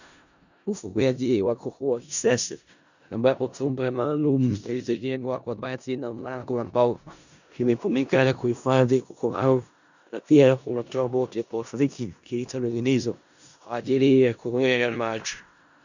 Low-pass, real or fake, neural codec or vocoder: 7.2 kHz; fake; codec, 16 kHz in and 24 kHz out, 0.4 kbps, LongCat-Audio-Codec, four codebook decoder